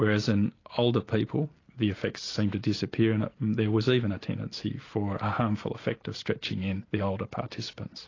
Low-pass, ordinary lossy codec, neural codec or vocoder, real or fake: 7.2 kHz; AAC, 32 kbps; none; real